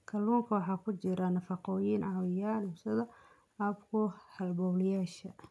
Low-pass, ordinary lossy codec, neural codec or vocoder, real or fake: none; none; none; real